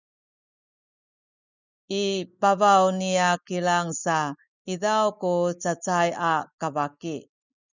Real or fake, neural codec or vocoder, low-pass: real; none; 7.2 kHz